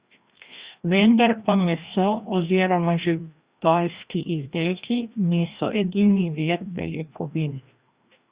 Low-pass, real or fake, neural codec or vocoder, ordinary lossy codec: 3.6 kHz; fake; codec, 16 kHz, 1 kbps, FreqCodec, larger model; Opus, 64 kbps